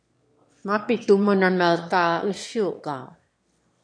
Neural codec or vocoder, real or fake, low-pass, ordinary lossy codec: autoencoder, 22.05 kHz, a latent of 192 numbers a frame, VITS, trained on one speaker; fake; 9.9 kHz; MP3, 48 kbps